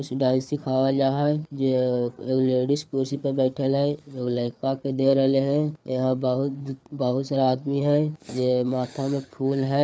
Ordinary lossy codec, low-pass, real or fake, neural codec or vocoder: none; none; fake; codec, 16 kHz, 4 kbps, FreqCodec, larger model